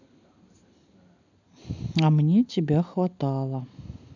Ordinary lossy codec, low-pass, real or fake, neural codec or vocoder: none; 7.2 kHz; real; none